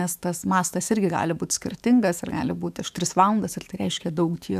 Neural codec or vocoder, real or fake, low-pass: autoencoder, 48 kHz, 128 numbers a frame, DAC-VAE, trained on Japanese speech; fake; 14.4 kHz